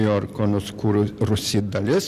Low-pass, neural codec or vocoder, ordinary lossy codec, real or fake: 14.4 kHz; none; Opus, 64 kbps; real